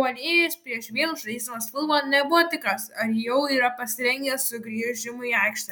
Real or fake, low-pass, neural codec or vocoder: real; 19.8 kHz; none